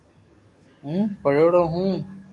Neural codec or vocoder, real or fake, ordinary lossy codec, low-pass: codec, 44.1 kHz, 7.8 kbps, DAC; fake; AAC, 48 kbps; 10.8 kHz